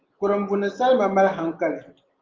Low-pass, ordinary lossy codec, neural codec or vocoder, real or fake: 7.2 kHz; Opus, 24 kbps; none; real